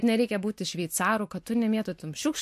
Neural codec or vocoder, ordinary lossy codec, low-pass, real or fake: none; MP3, 64 kbps; 14.4 kHz; real